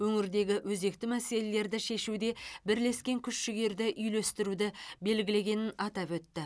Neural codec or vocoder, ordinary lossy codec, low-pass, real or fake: none; none; none; real